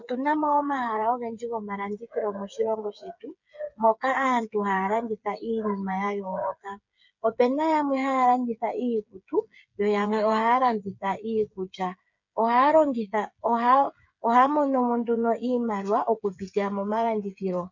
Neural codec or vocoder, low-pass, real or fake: codec, 16 kHz, 8 kbps, FreqCodec, smaller model; 7.2 kHz; fake